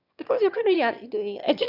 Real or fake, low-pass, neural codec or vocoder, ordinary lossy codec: fake; 5.4 kHz; autoencoder, 22.05 kHz, a latent of 192 numbers a frame, VITS, trained on one speaker; none